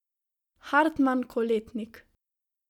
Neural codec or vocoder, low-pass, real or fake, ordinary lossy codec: none; 19.8 kHz; real; none